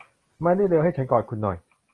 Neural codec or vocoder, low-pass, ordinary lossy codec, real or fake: none; 10.8 kHz; Opus, 24 kbps; real